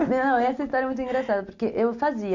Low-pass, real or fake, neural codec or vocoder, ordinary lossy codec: 7.2 kHz; real; none; none